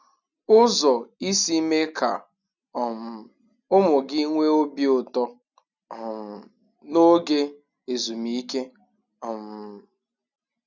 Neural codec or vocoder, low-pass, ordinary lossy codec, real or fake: none; 7.2 kHz; none; real